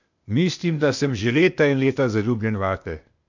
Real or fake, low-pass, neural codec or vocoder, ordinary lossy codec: fake; 7.2 kHz; codec, 16 kHz, 0.8 kbps, ZipCodec; none